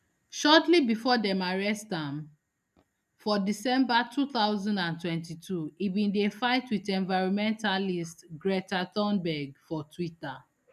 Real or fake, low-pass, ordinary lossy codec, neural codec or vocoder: real; 14.4 kHz; none; none